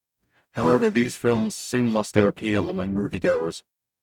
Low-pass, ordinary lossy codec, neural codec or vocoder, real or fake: 19.8 kHz; none; codec, 44.1 kHz, 0.9 kbps, DAC; fake